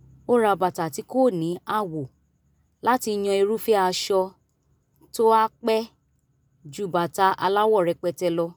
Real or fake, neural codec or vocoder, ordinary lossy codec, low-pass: real; none; none; none